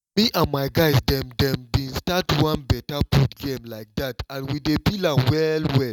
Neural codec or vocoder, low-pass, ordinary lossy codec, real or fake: none; 19.8 kHz; none; real